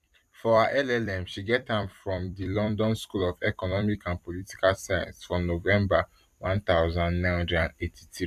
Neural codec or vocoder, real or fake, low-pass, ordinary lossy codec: vocoder, 44.1 kHz, 128 mel bands every 256 samples, BigVGAN v2; fake; 14.4 kHz; AAC, 96 kbps